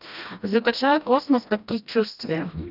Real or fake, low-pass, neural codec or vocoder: fake; 5.4 kHz; codec, 16 kHz, 1 kbps, FreqCodec, smaller model